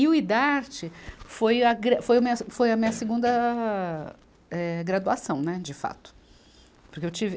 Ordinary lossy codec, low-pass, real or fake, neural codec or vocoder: none; none; real; none